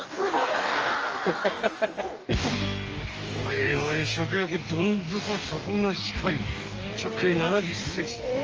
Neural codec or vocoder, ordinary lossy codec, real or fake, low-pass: codec, 44.1 kHz, 2.6 kbps, DAC; Opus, 24 kbps; fake; 7.2 kHz